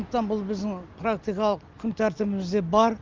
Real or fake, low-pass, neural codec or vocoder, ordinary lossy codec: real; 7.2 kHz; none; Opus, 32 kbps